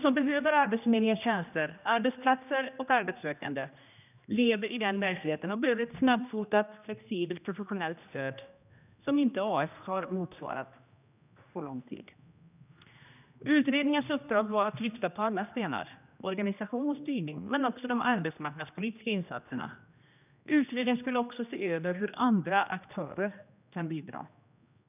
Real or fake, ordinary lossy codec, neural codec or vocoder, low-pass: fake; none; codec, 16 kHz, 1 kbps, X-Codec, HuBERT features, trained on general audio; 3.6 kHz